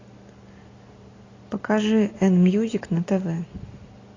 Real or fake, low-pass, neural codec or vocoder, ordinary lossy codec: real; 7.2 kHz; none; AAC, 32 kbps